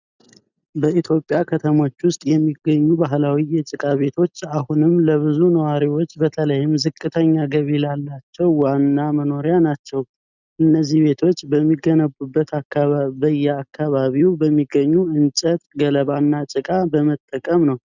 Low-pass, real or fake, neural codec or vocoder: 7.2 kHz; real; none